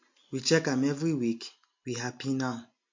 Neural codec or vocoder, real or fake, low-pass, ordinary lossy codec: none; real; 7.2 kHz; MP3, 48 kbps